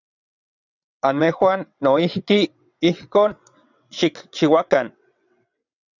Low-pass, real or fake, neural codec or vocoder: 7.2 kHz; fake; vocoder, 22.05 kHz, 80 mel bands, WaveNeXt